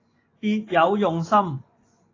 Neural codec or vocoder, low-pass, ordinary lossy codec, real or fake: vocoder, 24 kHz, 100 mel bands, Vocos; 7.2 kHz; AAC, 32 kbps; fake